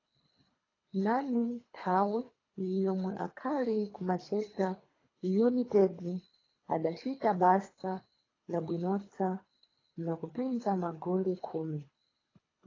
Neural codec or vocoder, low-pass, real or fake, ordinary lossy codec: codec, 24 kHz, 3 kbps, HILCodec; 7.2 kHz; fake; AAC, 32 kbps